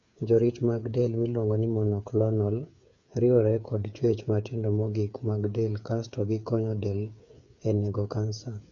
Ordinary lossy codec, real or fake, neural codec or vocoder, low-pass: none; fake; codec, 16 kHz, 8 kbps, FreqCodec, smaller model; 7.2 kHz